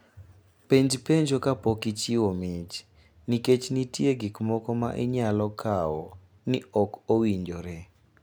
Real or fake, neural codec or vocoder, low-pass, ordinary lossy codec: real; none; none; none